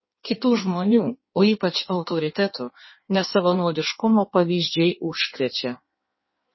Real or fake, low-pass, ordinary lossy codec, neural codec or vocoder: fake; 7.2 kHz; MP3, 24 kbps; codec, 16 kHz in and 24 kHz out, 1.1 kbps, FireRedTTS-2 codec